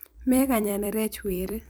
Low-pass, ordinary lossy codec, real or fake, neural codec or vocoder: none; none; fake; vocoder, 44.1 kHz, 128 mel bands every 256 samples, BigVGAN v2